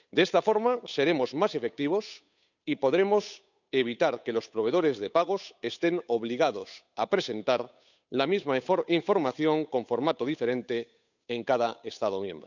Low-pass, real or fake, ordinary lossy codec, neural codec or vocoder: 7.2 kHz; fake; none; codec, 16 kHz, 8 kbps, FunCodec, trained on Chinese and English, 25 frames a second